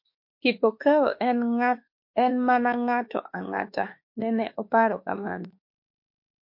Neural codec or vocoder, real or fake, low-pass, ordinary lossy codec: autoencoder, 48 kHz, 32 numbers a frame, DAC-VAE, trained on Japanese speech; fake; 5.4 kHz; MP3, 32 kbps